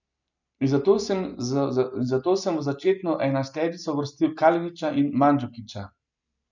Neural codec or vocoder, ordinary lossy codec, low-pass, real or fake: none; none; 7.2 kHz; real